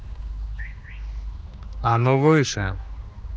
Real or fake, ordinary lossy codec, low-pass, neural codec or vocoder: fake; none; none; codec, 16 kHz, 2 kbps, X-Codec, HuBERT features, trained on general audio